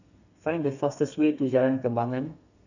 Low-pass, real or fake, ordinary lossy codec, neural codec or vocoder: 7.2 kHz; fake; none; codec, 32 kHz, 1.9 kbps, SNAC